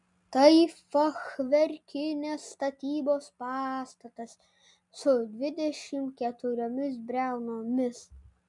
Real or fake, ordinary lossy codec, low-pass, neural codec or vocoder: real; MP3, 96 kbps; 10.8 kHz; none